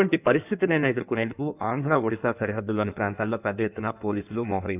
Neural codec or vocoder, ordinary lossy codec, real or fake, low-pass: codec, 16 kHz in and 24 kHz out, 1.1 kbps, FireRedTTS-2 codec; none; fake; 3.6 kHz